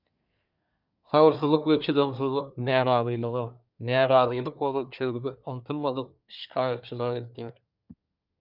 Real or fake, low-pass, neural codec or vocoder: fake; 5.4 kHz; codec, 24 kHz, 1 kbps, SNAC